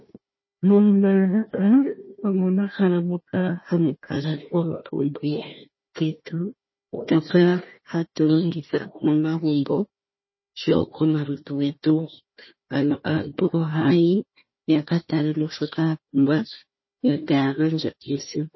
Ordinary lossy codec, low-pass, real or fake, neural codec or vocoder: MP3, 24 kbps; 7.2 kHz; fake; codec, 16 kHz, 1 kbps, FunCodec, trained on Chinese and English, 50 frames a second